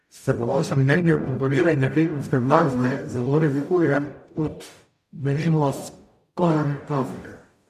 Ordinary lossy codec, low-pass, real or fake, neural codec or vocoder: AAC, 96 kbps; 14.4 kHz; fake; codec, 44.1 kHz, 0.9 kbps, DAC